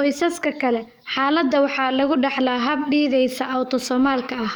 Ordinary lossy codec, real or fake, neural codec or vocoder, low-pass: none; fake; codec, 44.1 kHz, 7.8 kbps, DAC; none